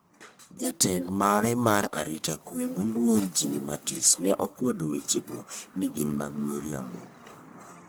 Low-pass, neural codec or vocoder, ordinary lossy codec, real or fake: none; codec, 44.1 kHz, 1.7 kbps, Pupu-Codec; none; fake